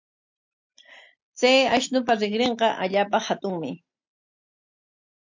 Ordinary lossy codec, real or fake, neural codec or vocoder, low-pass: MP3, 48 kbps; real; none; 7.2 kHz